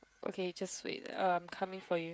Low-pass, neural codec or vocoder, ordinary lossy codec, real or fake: none; codec, 16 kHz, 16 kbps, FreqCodec, smaller model; none; fake